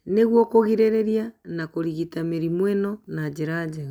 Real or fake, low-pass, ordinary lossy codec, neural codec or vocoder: real; 19.8 kHz; none; none